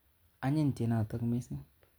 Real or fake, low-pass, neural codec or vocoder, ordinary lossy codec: real; none; none; none